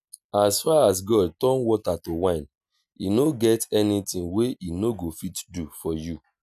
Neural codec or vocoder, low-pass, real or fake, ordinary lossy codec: none; 14.4 kHz; real; none